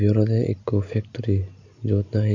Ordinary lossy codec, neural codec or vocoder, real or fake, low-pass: none; none; real; 7.2 kHz